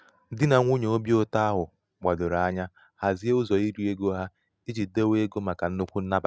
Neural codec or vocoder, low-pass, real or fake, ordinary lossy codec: none; none; real; none